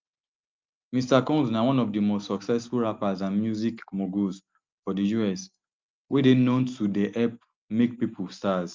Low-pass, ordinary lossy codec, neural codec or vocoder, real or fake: 7.2 kHz; Opus, 24 kbps; none; real